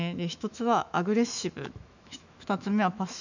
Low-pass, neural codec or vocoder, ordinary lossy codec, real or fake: 7.2 kHz; autoencoder, 48 kHz, 128 numbers a frame, DAC-VAE, trained on Japanese speech; none; fake